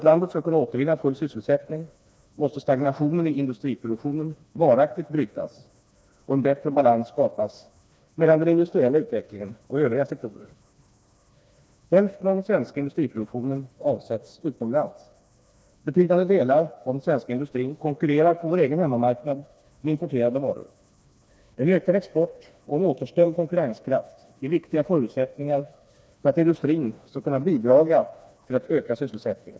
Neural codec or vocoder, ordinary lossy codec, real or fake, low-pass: codec, 16 kHz, 2 kbps, FreqCodec, smaller model; none; fake; none